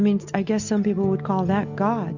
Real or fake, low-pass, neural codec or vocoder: real; 7.2 kHz; none